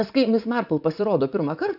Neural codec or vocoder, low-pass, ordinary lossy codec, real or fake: vocoder, 44.1 kHz, 80 mel bands, Vocos; 5.4 kHz; AAC, 48 kbps; fake